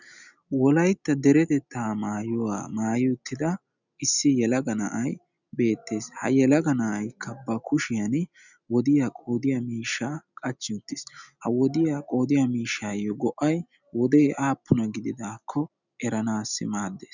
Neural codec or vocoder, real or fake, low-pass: none; real; 7.2 kHz